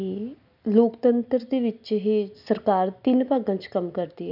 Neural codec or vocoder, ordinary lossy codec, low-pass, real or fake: none; none; 5.4 kHz; real